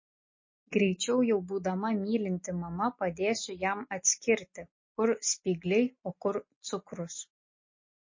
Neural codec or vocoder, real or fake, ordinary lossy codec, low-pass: none; real; MP3, 32 kbps; 7.2 kHz